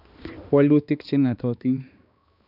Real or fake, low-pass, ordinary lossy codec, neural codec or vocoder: fake; 5.4 kHz; none; codec, 16 kHz, 2 kbps, X-Codec, HuBERT features, trained on balanced general audio